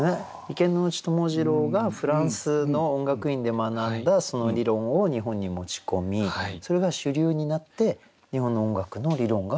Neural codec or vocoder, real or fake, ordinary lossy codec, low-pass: none; real; none; none